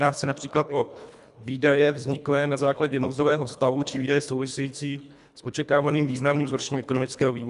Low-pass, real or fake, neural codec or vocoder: 10.8 kHz; fake; codec, 24 kHz, 1.5 kbps, HILCodec